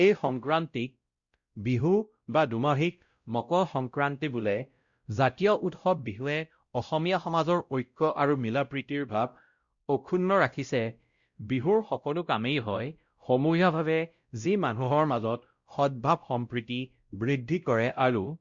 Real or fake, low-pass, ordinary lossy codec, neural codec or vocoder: fake; 7.2 kHz; Opus, 64 kbps; codec, 16 kHz, 0.5 kbps, X-Codec, WavLM features, trained on Multilingual LibriSpeech